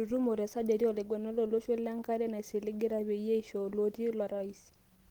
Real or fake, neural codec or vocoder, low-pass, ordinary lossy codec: real; none; 19.8 kHz; Opus, 16 kbps